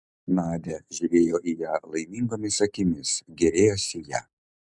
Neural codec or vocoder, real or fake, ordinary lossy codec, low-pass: none; real; MP3, 96 kbps; 10.8 kHz